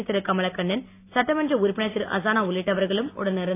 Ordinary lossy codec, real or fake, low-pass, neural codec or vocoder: AAC, 24 kbps; real; 3.6 kHz; none